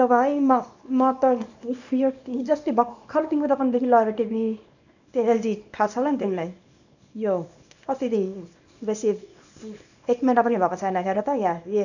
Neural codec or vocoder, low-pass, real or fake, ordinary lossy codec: codec, 24 kHz, 0.9 kbps, WavTokenizer, small release; 7.2 kHz; fake; none